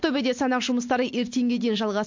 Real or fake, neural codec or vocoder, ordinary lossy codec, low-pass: real; none; MP3, 48 kbps; 7.2 kHz